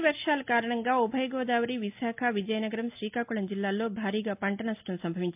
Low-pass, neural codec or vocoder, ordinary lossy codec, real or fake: 3.6 kHz; none; MP3, 32 kbps; real